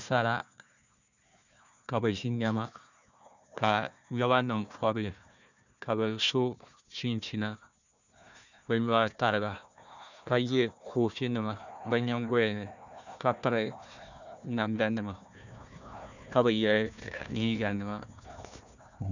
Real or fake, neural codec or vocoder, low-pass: fake; codec, 16 kHz, 1 kbps, FunCodec, trained on Chinese and English, 50 frames a second; 7.2 kHz